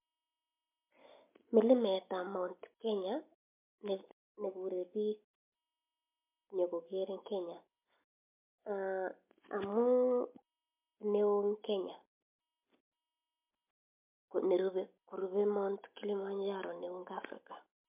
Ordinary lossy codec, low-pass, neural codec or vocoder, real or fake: MP3, 32 kbps; 3.6 kHz; none; real